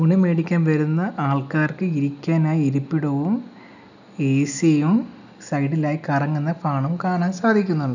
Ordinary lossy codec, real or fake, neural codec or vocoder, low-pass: none; real; none; 7.2 kHz